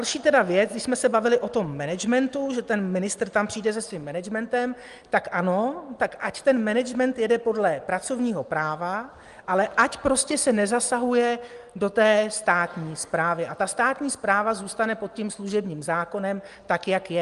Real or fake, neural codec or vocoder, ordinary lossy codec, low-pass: real; none; Opus, 32 kbps; 10.8 kHz